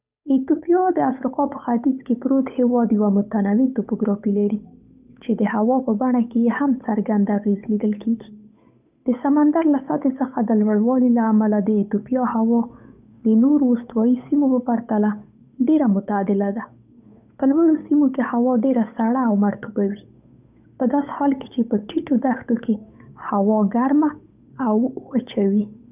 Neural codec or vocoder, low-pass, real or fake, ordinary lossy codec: codec, 16 kHz, 8 kbps, FunCodec, trained on Chinese and English, 25 frames a second; 3.6 kHz; fake; none